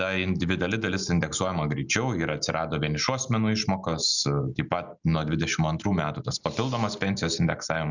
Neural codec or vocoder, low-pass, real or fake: none; 7.2 kHz; real